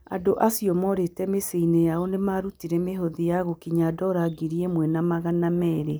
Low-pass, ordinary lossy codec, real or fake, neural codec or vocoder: none; none; real; none